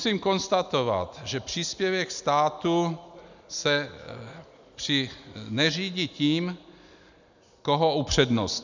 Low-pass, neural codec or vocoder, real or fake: 7.2 kHz; none; real